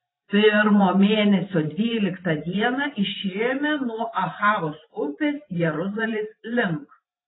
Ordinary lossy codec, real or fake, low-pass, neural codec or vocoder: AAC, 16 kbps; fake; 7.2 kHz; vocoder, 44.1 kHz, 128 mel bands every 512 samples, BigVGAN v2